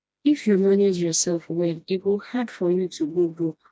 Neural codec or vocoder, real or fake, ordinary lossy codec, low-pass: codec, 16 kHz, 1 kbps, FreqCodec, smaller model; fake; none; none